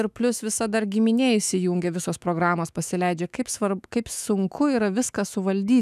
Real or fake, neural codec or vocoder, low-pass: fake; autoencoder, 48 kHz, 128 numbers a frame, DAC-VAE, trained on Japanese speech; 14.4 kHz